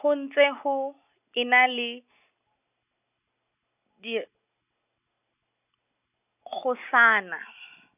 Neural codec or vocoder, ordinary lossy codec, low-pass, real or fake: none; none; 3.6 kHz; real